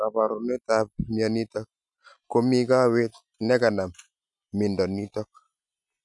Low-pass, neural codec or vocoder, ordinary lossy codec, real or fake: 10.8 kHz; none; none; real